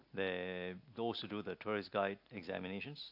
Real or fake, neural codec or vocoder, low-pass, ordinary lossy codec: real; none; 5.4 kHz; AAC, 48 kbps